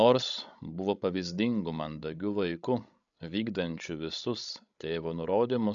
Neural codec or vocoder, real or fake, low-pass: codec, 16 kHz, 16 kbps, FreqCodec, larger model; fake; 7.2 kHz